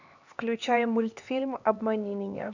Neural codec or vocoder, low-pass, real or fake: codec, 16 kHz, 2 kbps, X-Codec, HuBERT features, trained on LibriSpeech; 7.2 kHz; fake